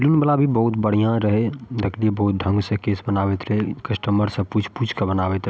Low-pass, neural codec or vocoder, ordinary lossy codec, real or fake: none; none; none; real